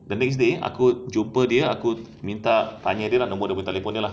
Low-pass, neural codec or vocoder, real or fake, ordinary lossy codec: none; none; real; none